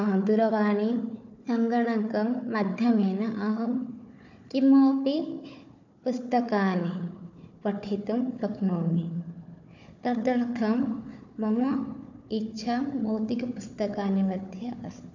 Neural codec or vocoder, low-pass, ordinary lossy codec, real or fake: codec, 16 kHz, 4 kbps, FunCodec, trained on Chinese and English, 50 frames a second; 7.2 kHz; none; fake